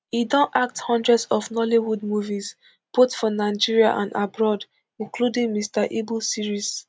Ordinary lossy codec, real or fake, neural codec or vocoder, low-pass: none; real; none; none